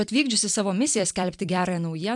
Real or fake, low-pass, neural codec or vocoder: fake; 10.8 kHz; vocoder, 44.1 kHz, 128 mel bands every 256 samples, BigVGAN v2